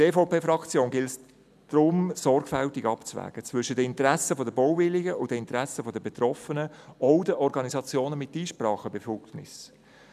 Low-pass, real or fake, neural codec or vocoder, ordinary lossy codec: 14.4 kHz; real; none; none